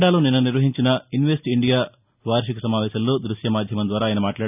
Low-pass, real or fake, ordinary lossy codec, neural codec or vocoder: 3.6 kHz; real; none; none